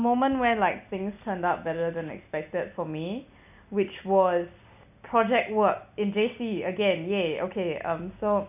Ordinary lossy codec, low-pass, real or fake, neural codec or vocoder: none; 3.6 kHz; real; none